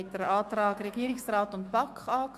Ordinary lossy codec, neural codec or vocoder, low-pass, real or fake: Opus, 64 kbps; autoencoder, 48 kHz, 128 numbers a frame, DAC-VAE, trained on Japanese speech; 14.4 kHz; fake